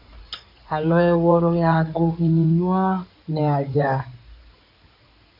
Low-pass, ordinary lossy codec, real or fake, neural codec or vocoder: 5.4 kHz; MP3, 48 kbps; fake; codec, 16 kHz in and 24 kHz out, 2.2 kbps, FireRedTTS-2 codec